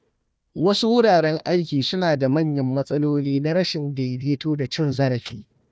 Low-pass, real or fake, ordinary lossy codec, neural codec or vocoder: none; fake; none; codec, 16 kHz, 1 kbps, FunCodec, trained on Chinese and English, 50 frames a second